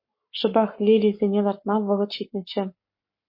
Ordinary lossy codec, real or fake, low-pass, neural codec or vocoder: MP3, 32 kbps; fake; 5.4 kHz; codec, 44.1 kHz, 7.8 kbps, Pupu-Codec